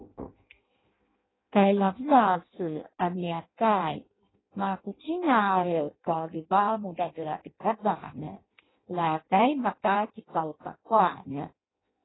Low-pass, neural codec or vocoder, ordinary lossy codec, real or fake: 7.2 kHz; codec, 16 kHz in and 24 kHz out, 0.6 kbps, FireRedTTS-2 codec; AAC, 16 kbps; fake